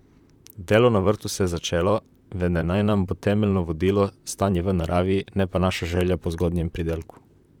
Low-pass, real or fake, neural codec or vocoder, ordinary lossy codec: 19.8 kHz; fake; vocoder, 44.1 kHz, 128 mel bands, Pupu-Vocoder; none